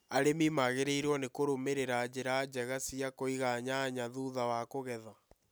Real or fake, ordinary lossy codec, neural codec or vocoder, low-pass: real; none; none; none